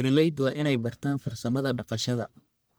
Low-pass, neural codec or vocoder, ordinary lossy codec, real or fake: none; codec, 44.1 kHz, 1.7 kbps, Pupu-Codec; none; fake